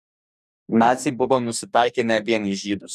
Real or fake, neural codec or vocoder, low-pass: fake; codec, 44.1 kHz, 2.6 kbps, DAC; 14.4 kHz